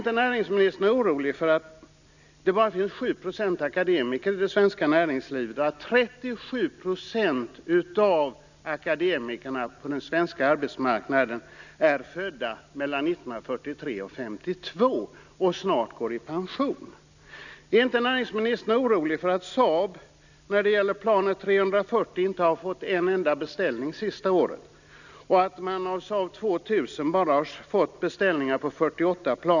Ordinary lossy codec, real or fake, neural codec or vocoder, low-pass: none; real; none; 7.2 kHz